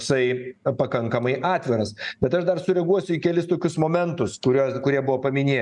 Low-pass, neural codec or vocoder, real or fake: 10.8 kHz; none; real